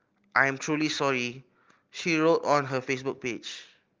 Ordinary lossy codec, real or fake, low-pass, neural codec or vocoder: Opus, 24 kbps; real; 7.2 kHz; none